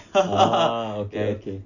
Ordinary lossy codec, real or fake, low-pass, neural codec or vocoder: none; real; 7.2 kHz; none